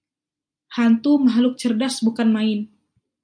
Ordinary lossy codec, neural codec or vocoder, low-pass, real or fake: MP3, 96 kbps; none; 9.9 kHz; real